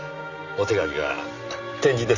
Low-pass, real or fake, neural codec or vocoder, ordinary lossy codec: 7.2 kHz; real; none; none